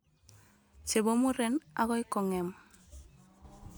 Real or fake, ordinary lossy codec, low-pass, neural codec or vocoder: real; none; none; none